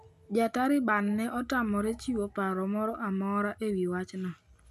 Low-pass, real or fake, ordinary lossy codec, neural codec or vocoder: 14.4 kHz; real; none; none